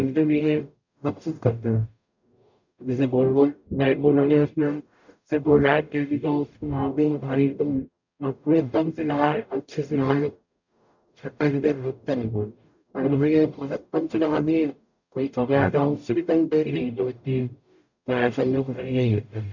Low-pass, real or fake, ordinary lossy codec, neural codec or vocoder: 7.2 kHz; fake; none; codec, 44.1 kHz, 0.9 kbps, DAC